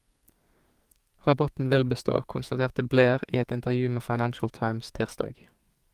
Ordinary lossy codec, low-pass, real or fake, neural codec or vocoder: Opus, 32 kbps; 14.4 kHz; fake; codec, 44.1 kHz, 2.6 kbps, SNAC